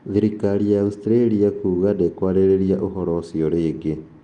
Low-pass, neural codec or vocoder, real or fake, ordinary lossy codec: 9.9 kHz; none; real; Opus, 24 kbps